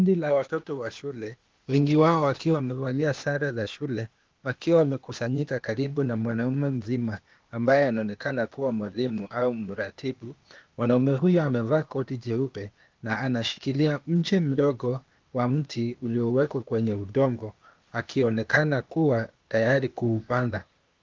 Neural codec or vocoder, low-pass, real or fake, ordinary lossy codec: codec, 16 kHz, 0.8 kbps, ZipCodec; 7.2 kHz; fake; Opus, 32 kbps